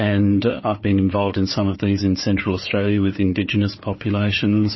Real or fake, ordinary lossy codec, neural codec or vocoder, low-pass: fake; MP3, 24 kbps; codec, 16 kHz, 4 kbps, FunCodec, trained on Chinese and English, 50 frames a second; 7.2 kHz